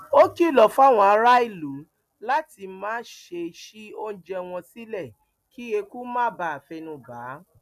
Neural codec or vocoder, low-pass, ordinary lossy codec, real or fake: none; 14.4 kHz; none; real